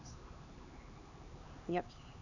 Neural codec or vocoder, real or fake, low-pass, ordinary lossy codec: codec, 16 kHz, 4 kbps, X-Codec, HuBERT features, trained on LibriSpeech; fake; 7.2 kHz; none